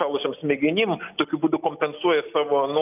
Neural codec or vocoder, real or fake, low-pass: none; real; 3.6 kHz